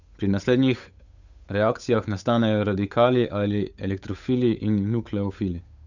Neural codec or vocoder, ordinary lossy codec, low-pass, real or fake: codec, 16 kHz, 8 kbps, FunCodec, trained on Chinese and English, 25 frames a second; none; 7.2 kHz; fake